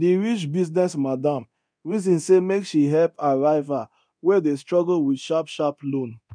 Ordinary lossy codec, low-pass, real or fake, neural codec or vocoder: none; 9.9 kHz; fake; codec, 24 kHz, 0.9 kbps, DualCodec